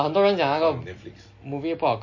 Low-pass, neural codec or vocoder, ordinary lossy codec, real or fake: 7.2 kHz; none; MP3, 32 kbps; real